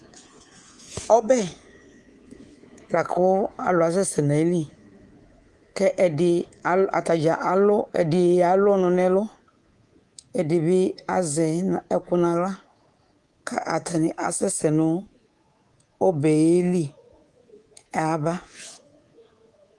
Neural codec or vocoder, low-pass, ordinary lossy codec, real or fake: codec, 24 kHz, 3.1 kbps, DualCodec; 10.8 kHz; Opus, 24 kbps; fake